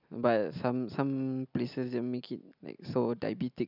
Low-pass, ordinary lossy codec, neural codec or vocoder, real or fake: 5.4 kHz; none; none; real